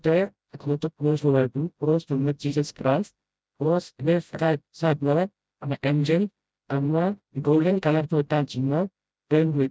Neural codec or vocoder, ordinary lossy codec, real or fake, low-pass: codec, 16 kHz, 0.5 kbps, FreqCodec, smaller model; none; fake; none